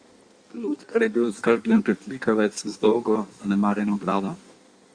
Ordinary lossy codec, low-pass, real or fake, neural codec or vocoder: Opus, 64 kbps; 9.9 kHz; fake; codec, 16 kHz in and 24 kHz out, 1.1 kbps, FireRedTTS-2 codec